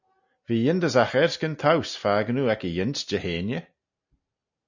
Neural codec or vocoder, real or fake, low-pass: none; real; 7.2 kHz